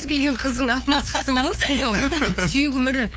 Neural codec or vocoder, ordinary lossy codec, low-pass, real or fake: codec, 16 kHz, 2 kbps, FunCodec, trained on LibriTTS, 25 frames a second; none; none; fake